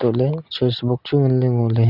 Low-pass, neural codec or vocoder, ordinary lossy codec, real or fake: 5.4 kHz; none; Opus, 64 kbps; real